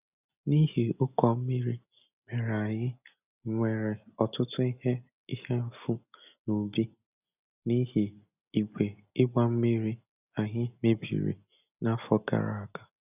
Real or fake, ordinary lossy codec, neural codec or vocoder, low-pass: real; none; none; 3.6 kHz